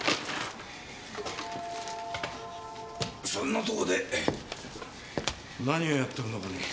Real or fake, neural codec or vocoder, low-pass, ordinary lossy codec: real; none; none; none